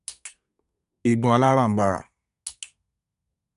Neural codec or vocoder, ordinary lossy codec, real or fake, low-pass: codec, 24 kHz, 1 kbps, SNAC; none; fake; 10.8 kHz